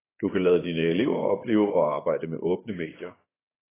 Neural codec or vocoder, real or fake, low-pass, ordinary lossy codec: vocoder, 24 kHz, 100 mel bands, Vocos; fake; 3.6 kHz; AAC, 16 kbps